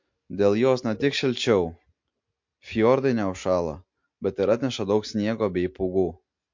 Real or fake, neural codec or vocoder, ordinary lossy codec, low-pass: real; none; MP3, 48 kbps; 7.2 kHz